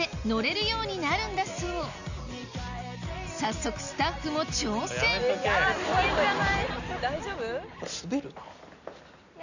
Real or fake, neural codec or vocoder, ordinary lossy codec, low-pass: real; none; none; 7.2 kHz